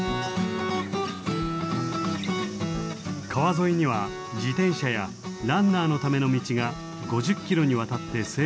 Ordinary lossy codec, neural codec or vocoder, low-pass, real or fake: none; none; none; real